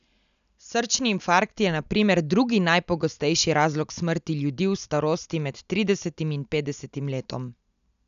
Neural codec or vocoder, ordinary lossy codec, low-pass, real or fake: none; none; 7.2 kHz; real